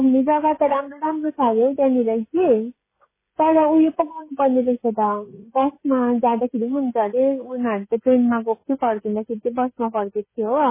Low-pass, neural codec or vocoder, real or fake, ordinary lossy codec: 3.6 kHz; codec, 16 kHz, 16 kbps, FreqCodec, smaller model; fake; MP3, 16 kbps